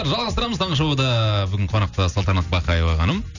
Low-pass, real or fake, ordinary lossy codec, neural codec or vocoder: 7.2 kHz; real; none; none